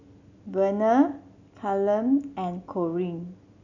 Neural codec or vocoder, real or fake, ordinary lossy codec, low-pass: none; real; none; 7.2 kHz